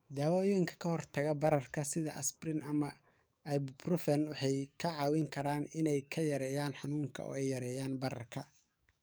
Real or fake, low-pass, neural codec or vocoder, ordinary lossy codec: fake; none; codec, 44.1 kHz, 7.8 kbps, DAC; none